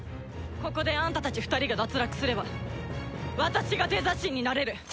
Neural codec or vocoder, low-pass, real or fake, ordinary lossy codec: none; none; real; none